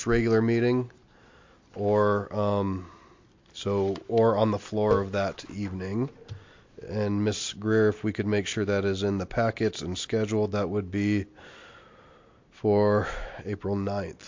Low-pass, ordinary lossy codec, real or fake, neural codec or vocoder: 7.2 kHz; MP3, 48 kbps; real; none